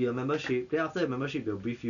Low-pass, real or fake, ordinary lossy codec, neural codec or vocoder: 7.2 kHz; real; none; none